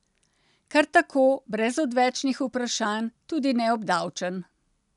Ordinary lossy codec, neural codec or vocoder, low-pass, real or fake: none; none; 10.8 kHz; real